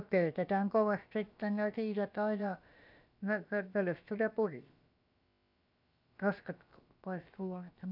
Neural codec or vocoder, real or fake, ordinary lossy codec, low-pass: codec, 16 kHz, about 1 kbps, DyCAST, with the encoder's durations; fake; none; 5.4 kHz